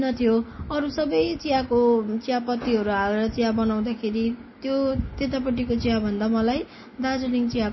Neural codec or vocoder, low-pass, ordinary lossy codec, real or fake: none; 7.2 kHz; MP3, 24 kbps; real